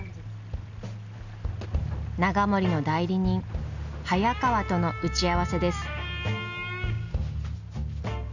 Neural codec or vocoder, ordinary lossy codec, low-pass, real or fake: none; none; 7.2 kHz; real